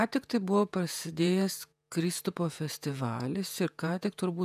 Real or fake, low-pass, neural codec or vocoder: fake; 14.4 kHz; vocoder, 48 kHz, 128 mel bands, Vocos